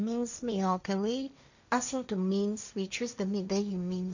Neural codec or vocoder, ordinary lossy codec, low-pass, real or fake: codec, 16 kHz, 1.1 kbps, Voila-Tokenizer; none; 7.2 kHz; fake